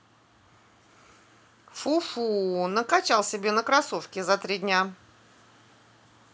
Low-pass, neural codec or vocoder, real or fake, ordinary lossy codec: none; none; real; none